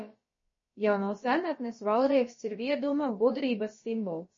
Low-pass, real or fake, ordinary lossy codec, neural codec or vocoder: 7.2 kHz; fake; MP3, 32 kbps; codec, 16 kHz, about 1 kbps, DyCAST, with the encoder's durations